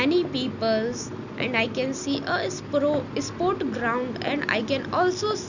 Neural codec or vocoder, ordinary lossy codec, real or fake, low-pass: none; none; real; 7.2 kHz